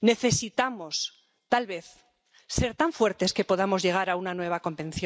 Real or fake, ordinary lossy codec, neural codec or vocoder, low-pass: real; none; none; none